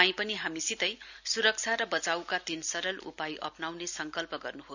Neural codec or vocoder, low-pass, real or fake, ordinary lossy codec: none; 7.2 kHz; real; none